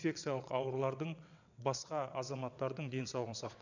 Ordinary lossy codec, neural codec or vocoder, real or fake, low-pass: none; vocoder, 22.05 kHz, 80 mel bands, WaveNeXt; fake; 7.2 kHz